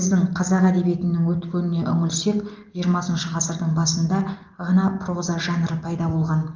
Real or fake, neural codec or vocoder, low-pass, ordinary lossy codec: real; none; 7.2 kHz; Opus, 32 kbps